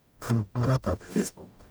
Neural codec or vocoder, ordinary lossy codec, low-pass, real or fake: codec, 44.1 kHz, 0.9 kbps, DAC; none; none; fake